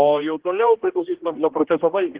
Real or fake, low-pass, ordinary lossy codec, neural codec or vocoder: fake; 3.6 kHz; Opus, 16 kbps; codec, 16 kHz, 1 kbps, X-Codec, HuBERT features, trained on general audio